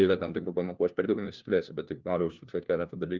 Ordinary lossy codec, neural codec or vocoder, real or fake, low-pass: Opus, 24 kbps; codec, 16 kHz, 1 kbps, FunCodec, trained on LibriTTS, 50 frames a second; fake; 7.2 kHz